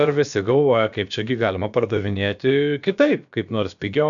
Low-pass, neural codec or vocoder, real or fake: 7.2 kHz; codec, 16 kHz, about 1 kbps, DyCAST, with the encoder's durations; fake